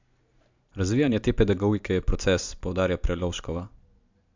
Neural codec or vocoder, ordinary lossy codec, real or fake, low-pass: none; MP3, 64 kbps; real; 7.2 kHz